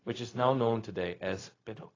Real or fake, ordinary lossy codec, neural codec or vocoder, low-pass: fake; AAC, 32 kbps; codec, 16 kHz, 0.4 kbps, LongCat-Audio-Codec; 7.2 kHz